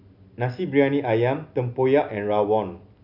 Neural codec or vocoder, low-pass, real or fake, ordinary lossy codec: none; 5.4 kHz; real; none